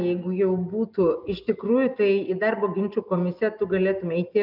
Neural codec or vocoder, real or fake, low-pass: none; real; 5.4 kHz